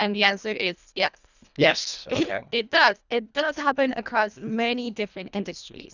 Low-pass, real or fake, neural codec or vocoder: 7.2 kHz; fake; codec, 24 kHz, 1.5 kbps, HILCodec